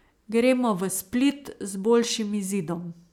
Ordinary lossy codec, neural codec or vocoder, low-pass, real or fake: none; none; 19.8 kHz; real